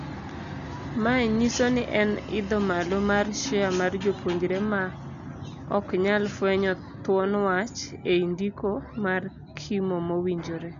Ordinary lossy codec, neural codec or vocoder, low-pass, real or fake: none; none; 7.2 kHz; real